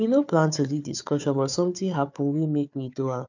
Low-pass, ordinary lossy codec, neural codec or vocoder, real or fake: 7.2 kHz; none; codec, 16 kHz, 4 kbps, FunCodec, trained on Chinese and English, 50 frames a second; fake